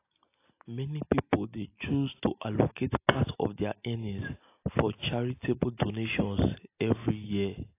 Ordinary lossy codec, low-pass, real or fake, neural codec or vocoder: AAC, 24 kbps; 3.6 kHz; real; none